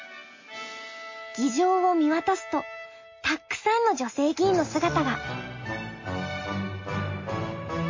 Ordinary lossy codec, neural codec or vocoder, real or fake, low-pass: MP3, 32 kbps; none; real; 7.2 kHz